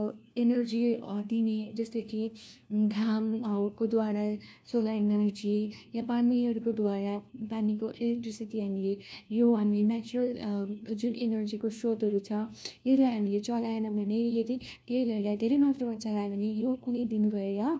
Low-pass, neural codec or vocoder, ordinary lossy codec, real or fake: none; codec, 16 kHz, 1 kbps, FunCodec, trained on LibriTTS, 50 frames a second; none; fake